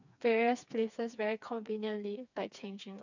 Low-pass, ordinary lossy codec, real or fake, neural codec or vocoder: 7.2 kHz; none; fake; codec, 16 kHz, 4 kbps, FreqCodec, smaller model